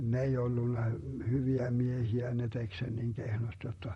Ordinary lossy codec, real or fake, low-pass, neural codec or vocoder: MP3, 48 kbps; fake; 19.8 kHz; vocoder, 44.1 kHz, 128 mel bands, Pupu-Vocoder